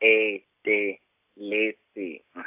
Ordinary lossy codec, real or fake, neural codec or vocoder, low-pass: AAC, 32 kbps; real; none; 3.6 kHz